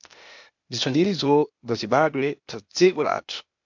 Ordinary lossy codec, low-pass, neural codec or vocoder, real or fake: MP3, 64 kbps; 7.2 kHz; codec, 16 kHz, 0.8 kbps, ZipCodec; fake